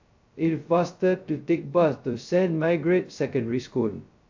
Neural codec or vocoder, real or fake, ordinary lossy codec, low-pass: codec, 16 kHz, 0.2 kbps, FocalCodec; fake; AAC, 48 kbps; 7.2 kHz